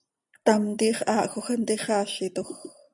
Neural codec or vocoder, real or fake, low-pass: none; real; 10.8 kHz